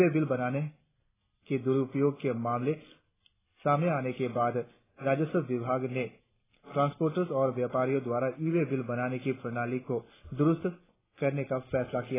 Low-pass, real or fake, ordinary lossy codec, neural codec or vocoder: 3.6 kHz; real; AAC, 16 kbps; none